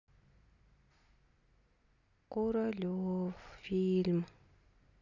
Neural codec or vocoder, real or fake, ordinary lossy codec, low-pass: none; real; none; 7.2 kHz